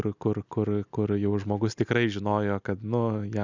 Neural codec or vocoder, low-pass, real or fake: none; 7.2 kHz; real